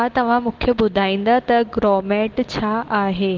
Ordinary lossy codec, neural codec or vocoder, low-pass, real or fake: Opus, 16 kbps; none; 7.2 kHz; real